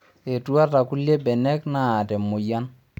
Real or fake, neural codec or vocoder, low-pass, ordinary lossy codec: real; none; 19.8 kHz; none